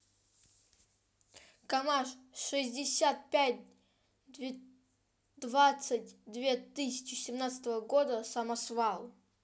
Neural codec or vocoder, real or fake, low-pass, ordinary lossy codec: none; real; none; none